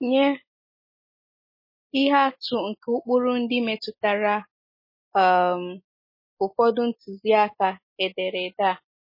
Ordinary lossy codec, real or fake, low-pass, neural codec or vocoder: MP3, 24 kbps; real; 5.4 kHz; none